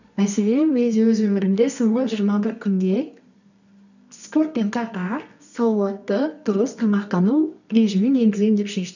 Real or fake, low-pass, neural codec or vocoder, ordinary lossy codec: fake; 7.2 kHz; codec, 24 kHz, 0.9 kbps, WavTokenizer, medium music audio release; none